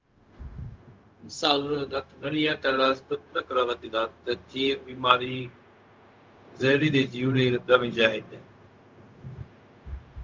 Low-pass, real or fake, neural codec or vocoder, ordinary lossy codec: 7.2 kHz; fake; codec, 16 kHz, 0.4 kbps, LongCat-Audio-Codec; Opus, 32 kbps